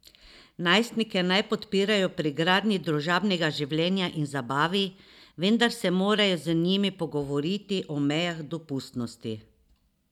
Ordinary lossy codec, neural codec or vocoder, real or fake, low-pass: none; none; real; 19.8 kHz